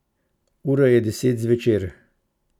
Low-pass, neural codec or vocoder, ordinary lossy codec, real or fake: 19.8 kHz; none; none; real